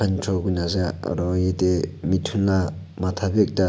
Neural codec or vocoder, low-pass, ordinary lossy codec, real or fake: none; none; none; real